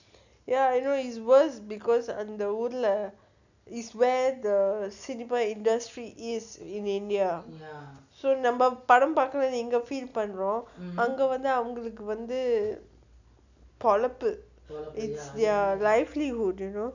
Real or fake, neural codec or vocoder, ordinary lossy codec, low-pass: real; none; none; 7.2 kHz